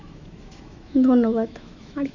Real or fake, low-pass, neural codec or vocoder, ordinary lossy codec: fake; 7.2 kHz; autoencoder, 48 kHz, 128 numbers a frame, DAC-VAE, trained on Japanese speech; none